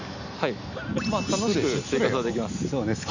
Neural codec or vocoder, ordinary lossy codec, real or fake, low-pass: none; none; real; 7.2 kHz